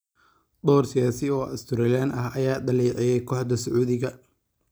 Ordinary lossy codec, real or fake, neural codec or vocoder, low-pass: none; real; none; none